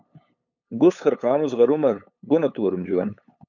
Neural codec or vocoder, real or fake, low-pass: codec, 16 kHz, 8 kbps, FunCodec, trained on LibriTTS, 25 frames a second; fake; 7.2 kHz